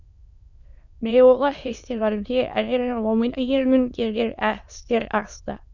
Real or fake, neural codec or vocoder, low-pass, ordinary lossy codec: fake; autoencoder, 22.05 kHz, a latent of 192 numbers a frame, VITS, trained on many speakers; 7.2 kHz; none